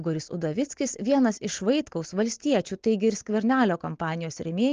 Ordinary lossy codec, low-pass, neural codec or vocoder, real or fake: Opus, 16 kbps; 7.2 kHz; none; real